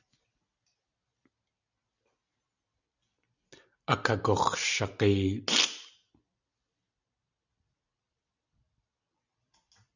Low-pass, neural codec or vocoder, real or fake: 7.2 kHz; vocoder, 44.1 kHz, 128 mel bands every 256 samples, BigVGAN v2; fake